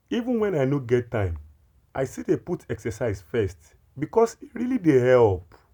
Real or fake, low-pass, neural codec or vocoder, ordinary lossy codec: real; 19.8 kHz; none; none